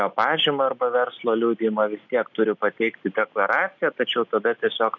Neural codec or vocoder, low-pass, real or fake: none; 7.2 kHz; real